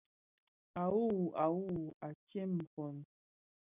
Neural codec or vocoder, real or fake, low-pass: none; real; 3.6 kHz